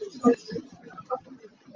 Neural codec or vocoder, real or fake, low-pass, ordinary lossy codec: none; real; 7.2 kHz; Opus, 24 kbps